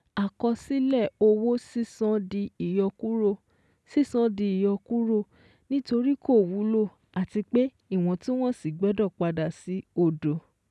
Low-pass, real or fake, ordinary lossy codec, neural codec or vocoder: none; real; none; none